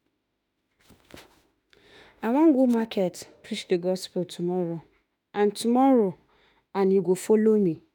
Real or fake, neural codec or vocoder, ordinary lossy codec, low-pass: fake; autoencoder, 48 kHz, 32 numbers a frame, DAC-VAE, trained on Japanese speech; none; 19.8 kHz